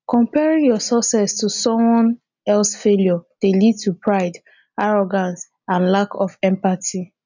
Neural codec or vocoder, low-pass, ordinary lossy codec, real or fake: none; 7.2 kHz; none; real